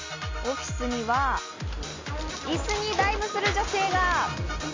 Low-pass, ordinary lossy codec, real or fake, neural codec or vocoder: 7.2 kHz; MP3, 48 kbps; real; none